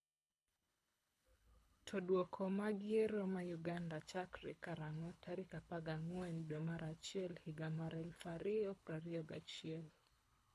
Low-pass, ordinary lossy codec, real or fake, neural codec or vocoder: none; none; fake; codec, 24 kHz, 6 kbps, HILCodec